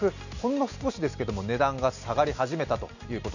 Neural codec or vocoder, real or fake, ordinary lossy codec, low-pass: none; real; none; 7.2 kHz